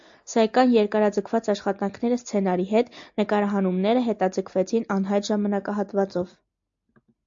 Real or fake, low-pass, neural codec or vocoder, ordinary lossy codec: real; 7.2 kHz; none; AAC, 64 kbps